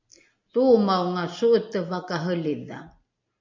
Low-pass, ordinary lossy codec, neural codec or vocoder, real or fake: 7.2 kHz; MP3, 48 kbps; none; real